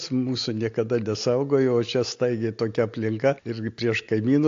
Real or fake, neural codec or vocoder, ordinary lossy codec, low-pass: real; none; AAC, 48 kbps; 7.2 kHz